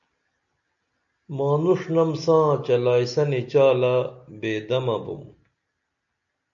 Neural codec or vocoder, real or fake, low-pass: none; real; 7.2 kHz